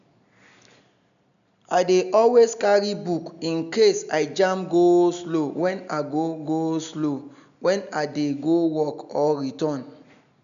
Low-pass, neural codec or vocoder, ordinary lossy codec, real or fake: 7.2 kHz; none; none; real